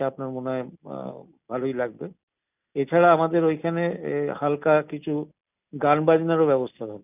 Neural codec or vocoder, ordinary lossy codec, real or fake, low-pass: none; none; real; 3.6 kHz